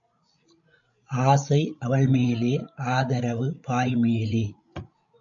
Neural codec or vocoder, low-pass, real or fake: codec, 16 kHz, 16 kbps, FreqCodec, larger model; 7.2 kHz; fake